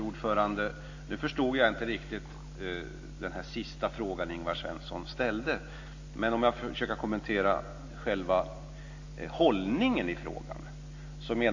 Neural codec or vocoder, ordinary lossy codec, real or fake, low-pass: none; AAC, 48 kbps; real; 7.2 kHz